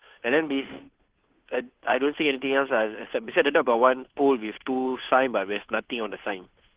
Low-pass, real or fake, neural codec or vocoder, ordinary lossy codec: 3.6 kHz; fake; codec, 16 kHz, 2 kbps, FunCodec, trained on Chinese and English, 25 frames a second; Opus, 16 kbps